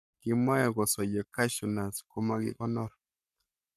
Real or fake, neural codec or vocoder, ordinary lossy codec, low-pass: fake; codec, 44.1 kHz, 7.8 kbps, Pupu-Codec; none; 14.4 kHz